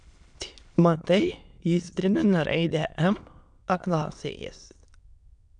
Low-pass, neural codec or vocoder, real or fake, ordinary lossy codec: 9.9 kHz; autoencoder, 22.05 kHz, a latent of 192 numbers a frame, VITS, trained on many speakers; fake; MP3, 96 kbps